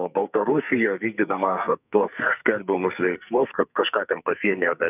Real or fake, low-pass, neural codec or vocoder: fake; 3.6 kHz; codec, 44.1 kHz, 2.6 kbps, SNAC